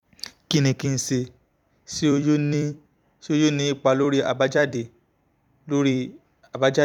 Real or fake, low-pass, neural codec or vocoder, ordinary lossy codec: fake; 19.8 kHz; vocoder, 44.1 kHz, 128 mel bands every 256 samples, BigVGAN v2; none